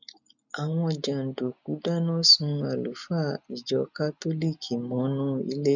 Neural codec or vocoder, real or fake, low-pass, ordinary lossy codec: none; real; 7.2 kHz; none